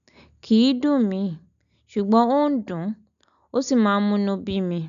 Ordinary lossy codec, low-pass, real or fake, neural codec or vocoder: none; 7.2 kHz; real; none